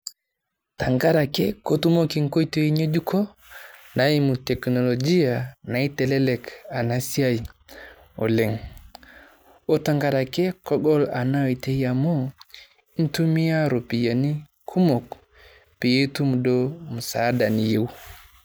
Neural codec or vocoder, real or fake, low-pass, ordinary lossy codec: none; real; none; none